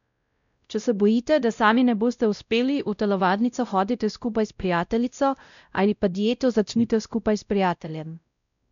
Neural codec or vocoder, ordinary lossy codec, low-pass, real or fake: codec, 16 kHz, 0.5 kbps, X-Codec, WavLM features, trained on Multilingual LibriSpeech; none; 7.2 kHz; fake